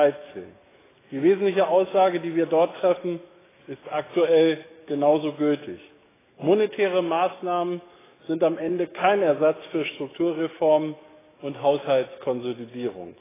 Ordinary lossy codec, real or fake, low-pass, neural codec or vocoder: AAC, 16 kbps; fake; 3.6 kHz; autoencoder, 48 kHz, 128 numbers a frame, DAC-VAE, trained on Japanese speech